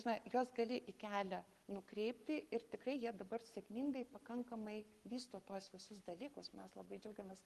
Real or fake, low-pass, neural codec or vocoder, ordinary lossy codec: fake; 14.4 kHz; codec, 44.1 kHz, 7.8 kbps, Pupu-Codec; Opus, 24 kbps